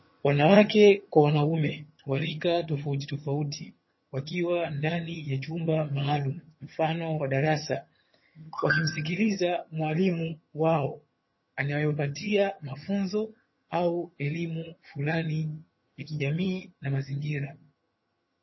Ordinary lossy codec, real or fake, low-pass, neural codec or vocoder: MP3, 24 kbps; fake; 7.2 kHz; vocoder, 22.05 kHz, 80 mel bands, HiFi-GAN